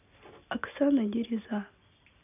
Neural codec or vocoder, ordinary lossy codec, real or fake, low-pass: none; none; real; 3.6 kHz